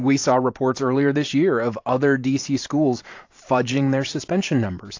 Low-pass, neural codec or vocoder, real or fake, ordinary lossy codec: 7.2 kHz; vocoder, 44.1 kHz, 128 mel bands every 512 samples, BigVGAN v2; fake; AAC, 48 kbps